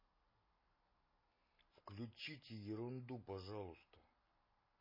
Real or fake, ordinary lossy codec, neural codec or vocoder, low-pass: real; MP3, 24 kbps; none; 5.4 kHz